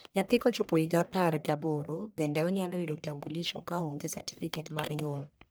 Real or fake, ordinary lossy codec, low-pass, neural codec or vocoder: fake; none; none; codec, 44.1 kHz, 1.7 kbps, Pupu-Codec